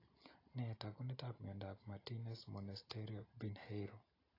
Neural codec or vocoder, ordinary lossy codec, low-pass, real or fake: none; AAC, 24 kbps; 5.4 kHz; real